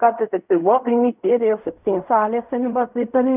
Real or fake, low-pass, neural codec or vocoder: fake; 3.6 kHz; codec, 16 kHz in and 24 kHz out, 0.4 kbps, LongCat-Audio-Codec, fine tuned four codebook decoder